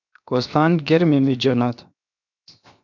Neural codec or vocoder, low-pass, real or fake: codec, 16 kHz, 0.7 kbps, FocalCodec; 7.2 kHz; fake